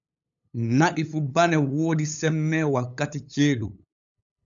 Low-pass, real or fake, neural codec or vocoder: 7.2 kHz; fake; codec, 16 kHz, 8 kbps, FunCodec, trained on LibriTTS, 25 frames a second